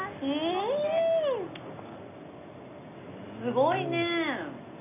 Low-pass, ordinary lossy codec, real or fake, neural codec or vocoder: 3.6 kHz; none; real; none